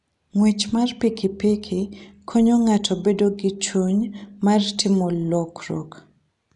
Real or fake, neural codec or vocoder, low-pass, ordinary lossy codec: real; none; 10.8 kHz; none